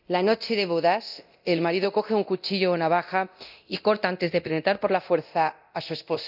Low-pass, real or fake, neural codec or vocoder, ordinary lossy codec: 5.4 kHz; fake; codec, 24 kHz, 0.9 kbps, DualCodec; none